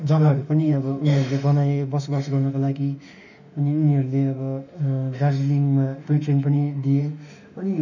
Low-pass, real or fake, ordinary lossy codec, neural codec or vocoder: 7.2 kHz; fake; none; autoencoder, 48 kHz, 32 numbers a frame, DAC-VAE, trained on Japanese speech